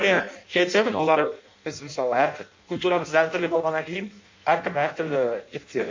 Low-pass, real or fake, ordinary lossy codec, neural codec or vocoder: 7.2 kHz; fake; MP3, 48 kbps; codec, 16 kHz in and 24 kHz out, 0.6 kbps, FireRedTTS-2 codec